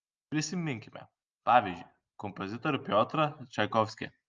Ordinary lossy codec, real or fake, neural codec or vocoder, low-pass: Opus, 24 kbps; real; none; 7.2 kHz